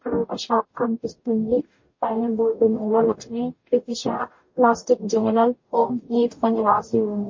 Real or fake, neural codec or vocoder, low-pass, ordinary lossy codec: fake; codec, 44.1 kHz, 0.9 kbps, DAC; 7.2 kHz; MP3, 32 kbps